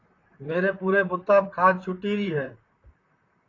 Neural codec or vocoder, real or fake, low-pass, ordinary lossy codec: vocoder, 44.1 kHz, 128 mel bands, Pupu-Vocoder; fake; 7.2 kHz; AAC, 32 kbps